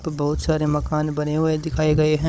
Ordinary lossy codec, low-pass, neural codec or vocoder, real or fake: none; none; codec, 16 kHz, 16 kbps, FunCodec, trained on Chinese and English, 50 frames a second; fake